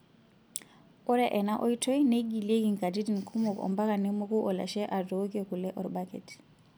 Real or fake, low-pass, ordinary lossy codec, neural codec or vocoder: real; none; none; none